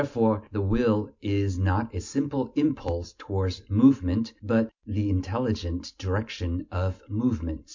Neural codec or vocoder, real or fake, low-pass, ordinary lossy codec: none; real; 7.2 kHz; MP3, 48 kbps